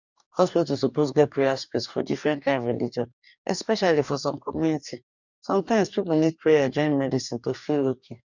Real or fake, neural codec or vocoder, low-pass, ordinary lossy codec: fake; codec, 44.1 kHz, 2.6 kbps, DAC; 7.2 kHz; none